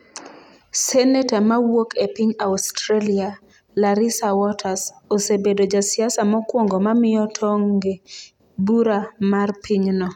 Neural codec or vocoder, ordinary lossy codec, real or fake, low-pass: none; none; real; 19.8 kHz